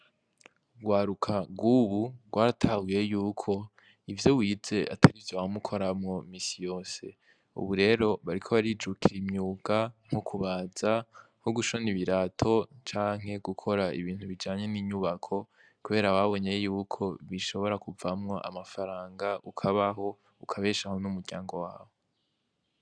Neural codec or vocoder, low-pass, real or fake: none; 9.9 kHz; real